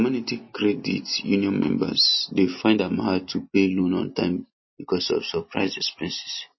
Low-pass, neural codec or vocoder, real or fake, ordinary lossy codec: 7.2 kHz; none; real; MP3, 24 kbps